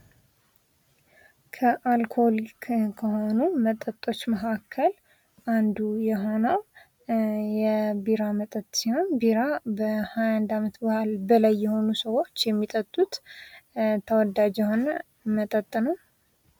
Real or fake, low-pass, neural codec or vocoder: real; 19.8 kHz; none